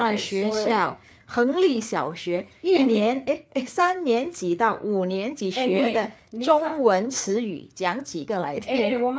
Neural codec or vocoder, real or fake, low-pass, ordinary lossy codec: codec, 16 kHz, 4 kbps, FreqCodec, larger model; fake; none; none